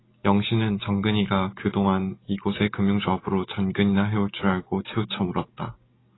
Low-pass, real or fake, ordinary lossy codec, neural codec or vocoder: 7.2 kHz; real; AAC, 16 kbps; none